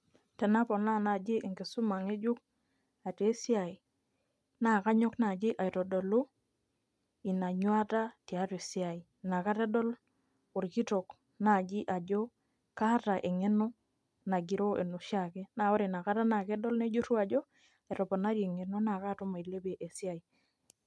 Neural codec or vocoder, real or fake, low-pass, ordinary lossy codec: none; real; none; none